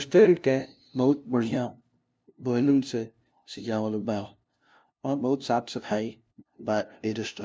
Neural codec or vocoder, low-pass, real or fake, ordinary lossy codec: codec, 16 kHz, 0.5 kbps, FunCodec, trained on LibriTTS, 25 frames a second; none; fake; none